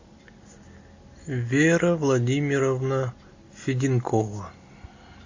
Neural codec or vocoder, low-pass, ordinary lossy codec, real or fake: none; 7.2 kHz; AAC, 48 kbps; real